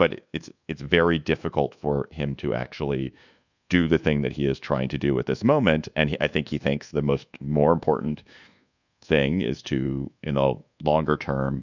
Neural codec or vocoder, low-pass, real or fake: codec, 24 kHz, 1.2 kbps, DualCodec; 7.2 kHz; fake